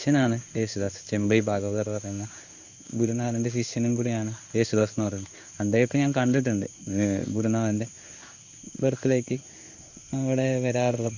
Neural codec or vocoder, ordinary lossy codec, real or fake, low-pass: codec, 16 kHz in and 24 kHz out, 1 kbps, XY-Tokenizer; Opus, 64 kbps; fake; 7.2 kHz